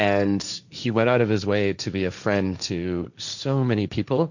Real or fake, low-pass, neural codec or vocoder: fake; 7.2 kHz; codec, 16 kHz, 1.1 kbps, Voila-Tokenizer